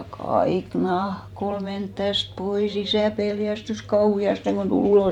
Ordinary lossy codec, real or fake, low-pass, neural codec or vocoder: none; fake; 19.8 kHz; vocoder, 44.1 kHz, 128 mel bands every 512 samples, BigVGAN v2